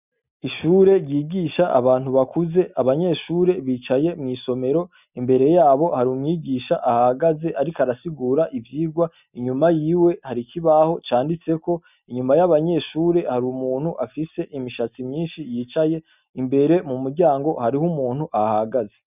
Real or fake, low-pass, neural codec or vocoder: real; 3.6 kHz; none